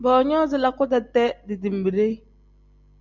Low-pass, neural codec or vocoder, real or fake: 7.2 kHz; none; real